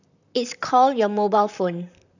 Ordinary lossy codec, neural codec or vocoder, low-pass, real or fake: none; vocoder, 44.1 kHz, 128 mel bands, Pupu-Vocoder; 7.2 kHz; fake